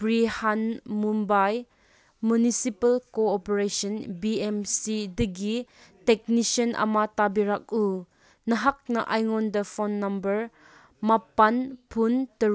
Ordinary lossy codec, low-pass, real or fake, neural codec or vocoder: none; none; real; none